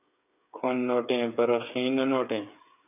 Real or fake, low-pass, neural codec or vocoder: fake; 3.6 kHz; codec, 16 kHz, 8 kbps, FreqCodec, smaller model